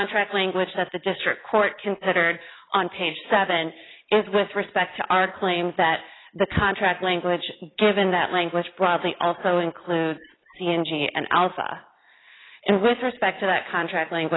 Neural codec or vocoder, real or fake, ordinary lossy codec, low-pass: none; real; AAC, 16 kbps; 7.2 kHz